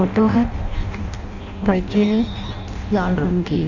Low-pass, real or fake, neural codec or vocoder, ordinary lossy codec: 7.2 kHz; fake; codec, 16 kHz in and 24 kHz out, 0.6 kbps, FireRedTTS-2 codec; none